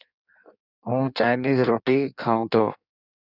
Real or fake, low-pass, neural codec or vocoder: fake; 5.4 kHz; codec, 16 kHz in and 24 kHz out, 1.1 kbps, FireRedTTS-2 codec